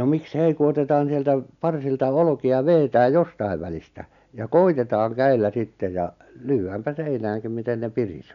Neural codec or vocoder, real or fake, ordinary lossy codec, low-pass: none; real; none; 7.2 kHz